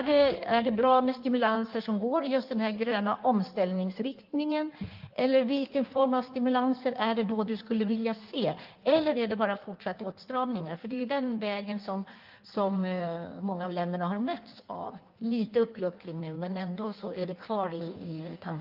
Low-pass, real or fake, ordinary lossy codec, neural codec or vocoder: 5.4 kHz; fake; Opus, 32 kbps; codec, 16 kHz in and 24 kHz out, 1.1 kbps, FireRedTTS-2 codec